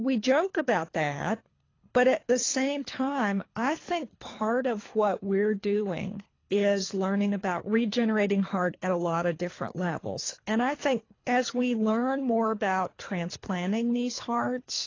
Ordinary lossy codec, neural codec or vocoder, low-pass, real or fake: AAC, 32 kbps; codec, 24 kHz, 3 kbps, HILCodec; 7.2 kHz; fake